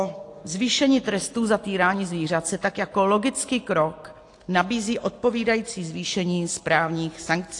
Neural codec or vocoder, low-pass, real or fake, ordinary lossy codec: none; 10.8 kHz; real; AAC, 48 kbps